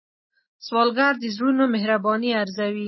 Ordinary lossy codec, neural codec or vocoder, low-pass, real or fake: MP3, 24 kbps; none; 7.2 kHz; real